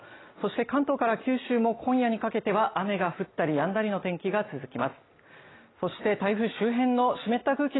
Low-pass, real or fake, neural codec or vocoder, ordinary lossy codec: 7.2 kHz; real; none; AAC, 16 kbps